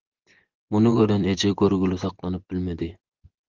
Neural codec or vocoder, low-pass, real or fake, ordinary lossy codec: vocoder, 24 kHz, 100 mel bands, Vocos; 7.2 kHz; fake; Opus, 16 kbps